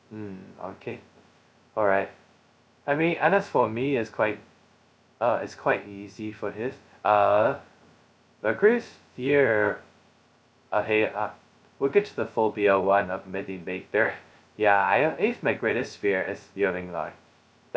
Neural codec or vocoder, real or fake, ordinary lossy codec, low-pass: codec, 16 kHz, 0.2 kbps, FocalCodec; fake; none; none